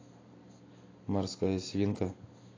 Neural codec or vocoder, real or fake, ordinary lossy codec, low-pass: none; real; AAC, 32 kbps; 7.2 kHz